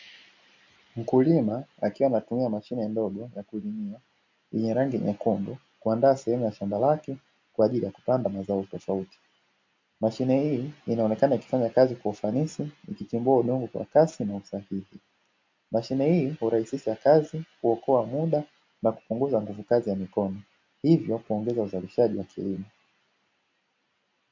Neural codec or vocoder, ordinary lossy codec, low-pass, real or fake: none; MP3, 48 kbps; 7.2 kHz; real